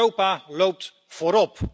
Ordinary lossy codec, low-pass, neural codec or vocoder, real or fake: none; none; none; real